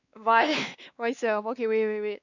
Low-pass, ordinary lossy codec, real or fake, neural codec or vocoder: 7.2 kHz; none; fake; codec, 16 kHz, 2 kbps, X-Codec, WavLM features, trained on Multilingual LibriSpeech